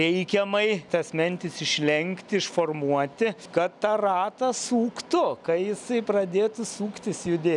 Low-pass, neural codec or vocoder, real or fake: 10.8 kHz; none; real